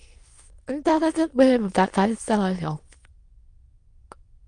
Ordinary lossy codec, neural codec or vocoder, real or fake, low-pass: Opus, 32 kbps; autoencoder, 22.05 kHz, a latent of 192 numbers a frame, VITS, trained on many speakers; fake; 9.9 kHz